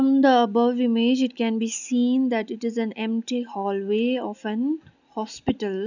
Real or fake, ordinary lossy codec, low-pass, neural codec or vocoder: real; none; 7.2 kHz; none